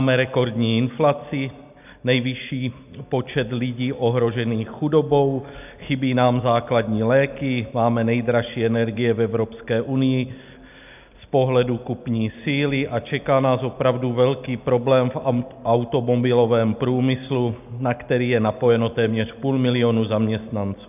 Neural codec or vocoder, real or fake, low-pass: none; real; 3.6 kHz